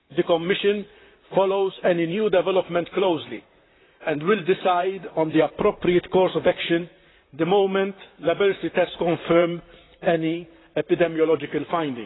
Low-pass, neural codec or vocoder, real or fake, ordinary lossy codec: 7.2 kHz; vocoder, 44.1 kHz, 128 mel bands, Pupu-Vocoder; fake; AAC, 16 kbps